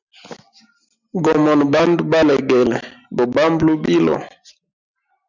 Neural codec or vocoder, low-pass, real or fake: none; 7.2 kHz; real